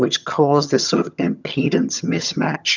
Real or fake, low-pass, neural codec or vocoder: fake; 7.2 kHz; vocoder, 22.05 kHz, 80 mel bands, HiFi-GAN